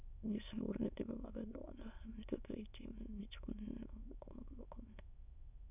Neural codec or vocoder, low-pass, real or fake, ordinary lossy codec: autoencoder, 22.05 kHz, a latent of 192 numbers a frame, VITS, trained on many speakers; 3.6 kHz; fake; none